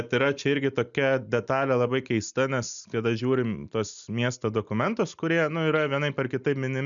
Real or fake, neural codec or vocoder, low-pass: real; none; 7.2 kHz